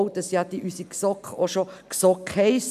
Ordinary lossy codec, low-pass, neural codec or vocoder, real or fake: none; 14.4 kHz; none; real